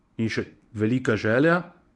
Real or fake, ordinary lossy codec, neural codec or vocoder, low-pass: fake; none; codec, 24 kHz, 0.9 kbps, WavTokenizer, medium speech release version 1; 10.8 kHz